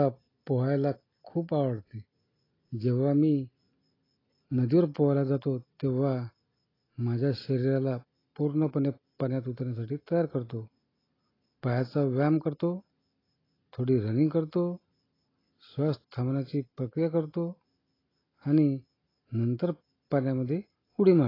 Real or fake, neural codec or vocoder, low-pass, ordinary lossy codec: real; none; 5.4 kHz; AAC, 24 kbps